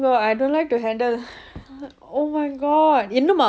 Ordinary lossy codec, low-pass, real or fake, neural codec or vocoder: none; none; real; none